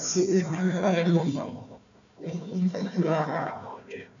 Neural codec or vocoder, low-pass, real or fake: codec, 16 kHz, 1 kbps, FunCodec, trained on Chinese and English, 50 frames a second; 7.2 kHz; fake